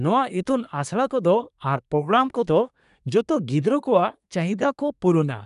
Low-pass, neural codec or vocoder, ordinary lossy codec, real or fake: 10.8 kHz; codec, 24 kHz, 1 kbps, SNAC; none; fake